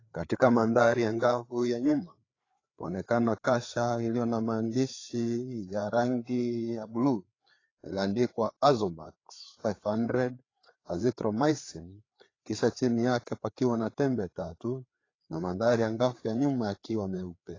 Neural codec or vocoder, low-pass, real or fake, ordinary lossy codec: codec, 16 kHz, 4 kbps, FreqCodec, larger model; 7.2 kHz; fake; AAC, 32 kbps